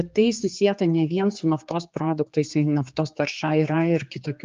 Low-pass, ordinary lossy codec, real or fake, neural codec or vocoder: 7.2 kHz; Opus, 24 kbps; fake; codec, 16 kHz, 4 kbps, X-Codec, HuBERT features, trained on general audio